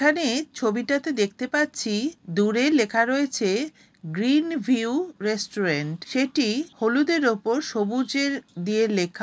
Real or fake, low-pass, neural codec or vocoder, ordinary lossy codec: real; none; none; none